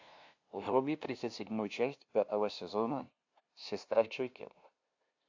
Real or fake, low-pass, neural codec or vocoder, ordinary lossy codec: fake; 7.2 kHz; codec, 16 kHz, 0.5 kbps, FunCodec, trained on LibriTTS, 25 frames a second; AAC, 48 kbps